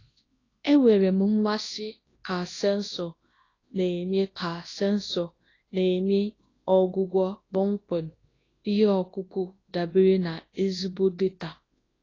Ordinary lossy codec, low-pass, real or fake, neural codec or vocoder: AAC, 32 kbps; 7.2 kHz; fake; codec, 24 kHz, 0.9 kbps, WavTokenizer, large speech release